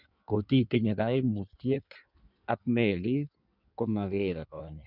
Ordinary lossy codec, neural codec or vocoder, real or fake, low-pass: none; codec, 16 kHz in and 24 kHz out, 1.1 kbps, FireRedTTS-2 codec; fake; 5.4 kHz